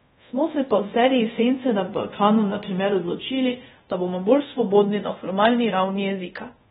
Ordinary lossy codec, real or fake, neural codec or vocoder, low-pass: AAC, 16 kbps; fake; codec, 24 kHz, 0.5 kbps, DualCodec; 10.8 kHz